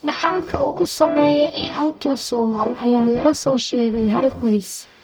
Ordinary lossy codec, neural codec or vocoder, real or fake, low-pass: none; codec, 44.1 kHz, 0.9 kbps, DAC; fake; none